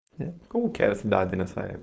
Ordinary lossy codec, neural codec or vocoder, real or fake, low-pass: none; codec, 16 kHz, 4.8 kbps, FACodec; fake; none